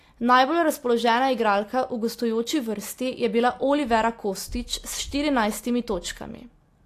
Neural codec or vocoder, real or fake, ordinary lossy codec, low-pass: none; real; AAC, 64 kbps; 14.4 kHz